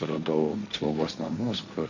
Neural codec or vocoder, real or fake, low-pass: codec, 16 kHz, 1.1 kbps, Voila-Tokenizer; fake; 7.2 kHz